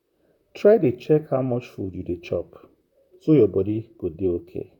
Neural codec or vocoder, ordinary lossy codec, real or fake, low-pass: vocoder, 44.1 kHz, 128 mel bands, Pupu-Vocoder; none; fake; 19.8 kHz